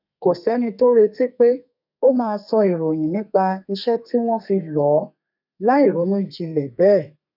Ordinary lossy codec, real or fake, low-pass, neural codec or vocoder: none; fake; 5.4 kHz; codec, 32 kHz, 1.9 kbps, SNAC